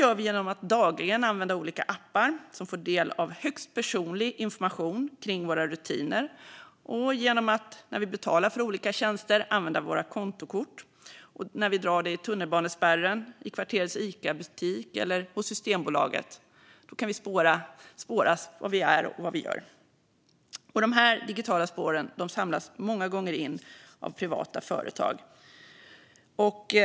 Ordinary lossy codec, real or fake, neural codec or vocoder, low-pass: none; real; none; none